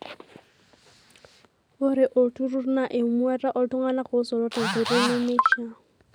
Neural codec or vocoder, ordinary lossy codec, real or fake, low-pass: none; none; real; none